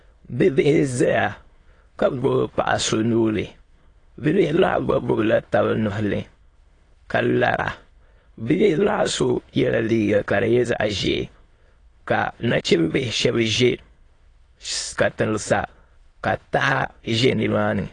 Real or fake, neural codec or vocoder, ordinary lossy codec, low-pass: fake; autoencoder, 22.05 kHz, a latent of 192 numbers a frame, VITS, trained on many speakers; AAC, 32 kbps; 9.9 kHz